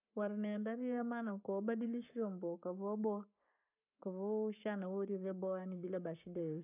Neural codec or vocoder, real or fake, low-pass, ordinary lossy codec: codec, 44.1 kHz, 7.8 kbps, Pupu-Codec; fake; 3.6 kHz; none